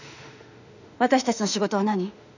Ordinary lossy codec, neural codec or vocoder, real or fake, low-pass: none; autoencoder, 48 kHz, 32 numbers a frame, DAC-VAE, trained on Japanese speech; fake; 7.2 kHz